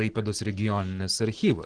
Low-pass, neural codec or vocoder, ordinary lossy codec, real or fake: 9.9 kHz; none; Opus, 16 kbps; real